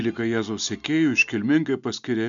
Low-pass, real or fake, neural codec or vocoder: 7.2 kHz; real; none